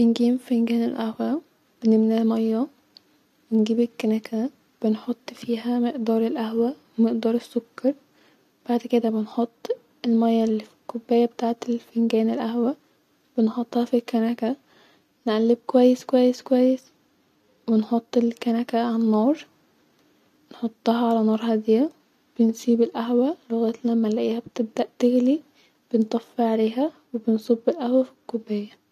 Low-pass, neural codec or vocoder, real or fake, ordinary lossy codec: 14.4 kHz; none; real; AAC, 96 kbps